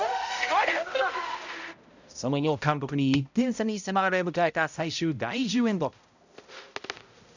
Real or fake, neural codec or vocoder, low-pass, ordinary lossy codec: fake; codec, 16 kHz, 0.5 kbps, X-Codec, HuBERT features, trained on balanced general audio; 7.2 kHz; none